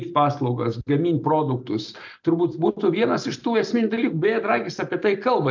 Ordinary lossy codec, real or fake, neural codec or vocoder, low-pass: MP3, 64 kbps; real; none; 7.2 kHz